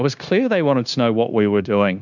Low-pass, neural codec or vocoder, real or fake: 7.2 kHz; codec, 16 kHz, 0.9 kbps, LongCat-Audio-Codec; fake